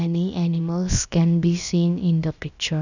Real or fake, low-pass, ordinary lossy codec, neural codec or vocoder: fake; 7.2 kHz; none; codec, 16 kHz, about 1 kbps, DyCAST, with the encoder's durations